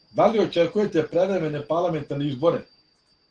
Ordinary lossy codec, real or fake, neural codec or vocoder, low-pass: Opus, 16 kbps; real; none; 9.9 kHz